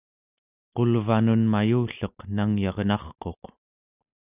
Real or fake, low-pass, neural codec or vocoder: real; 3.6 kHz; none